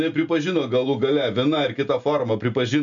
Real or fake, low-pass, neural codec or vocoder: real; 7.2 kHz; none